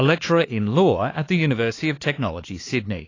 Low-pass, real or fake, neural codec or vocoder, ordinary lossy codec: 7.2 kHz; fake; codec, 16 kHz, 4 kbps, X-Codec, HuBERT features, trained on LibriSpeech; AAC, 32 kbps